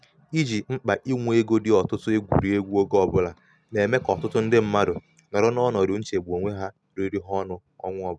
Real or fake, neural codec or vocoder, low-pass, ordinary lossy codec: real; none; none; none